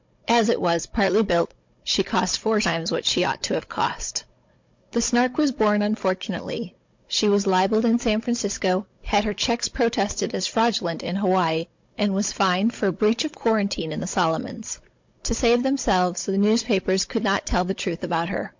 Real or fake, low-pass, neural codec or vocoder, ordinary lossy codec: fake; 7.2 kHz; codec, 16 kHz, 16 kbps, FunCodec, trained on Chinese and English, 50 frames a second; MP3, 48 kbps